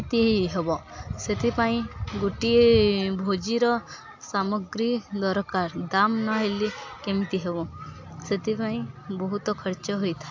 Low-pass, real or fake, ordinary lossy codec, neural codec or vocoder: 7.2 kHz; real; AAC, 48 kbps; none